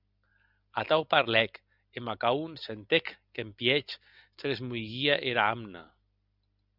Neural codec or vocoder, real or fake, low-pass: none; real; 5.4 kHz